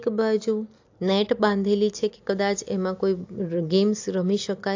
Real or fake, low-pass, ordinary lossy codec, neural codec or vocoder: real; 7.2 kHz; AAC, 48 kbps; none